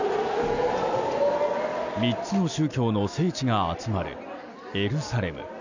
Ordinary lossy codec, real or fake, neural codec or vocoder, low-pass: none; real; none; 7.2 kHz